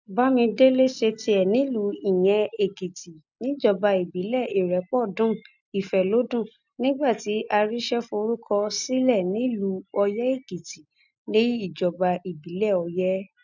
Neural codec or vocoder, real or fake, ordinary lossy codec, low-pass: none; real; none; 7.2 kHz